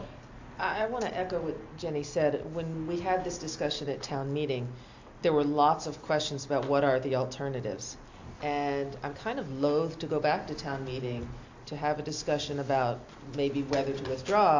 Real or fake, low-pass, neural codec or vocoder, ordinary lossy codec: real; 7.2 kHz; none; AAC, 48 kbps